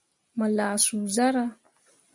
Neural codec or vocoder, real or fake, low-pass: none; real; 10.8 kHz